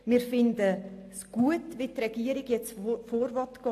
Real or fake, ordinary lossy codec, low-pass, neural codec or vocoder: real; AAC, 64 kbps; 14.4 kHz; none